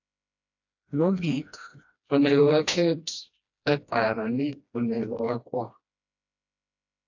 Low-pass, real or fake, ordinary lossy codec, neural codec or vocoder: 7.2 kHz; fake; AAC, 48 kbps; codec, 16 kHz, 1 kbps, FreqCodec, smaller model